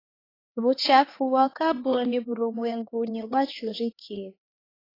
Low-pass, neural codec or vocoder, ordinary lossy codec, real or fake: 5.4 kHz; codec, 16 kHz, 2 kbps, X-Codec, HuBERT features, trained on LibriSpeech; AAC, 24 kbps; fake